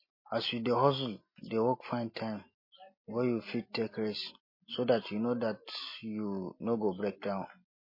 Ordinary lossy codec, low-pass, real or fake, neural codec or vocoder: MP3, 24 kbps; 5.4 kHz; real; none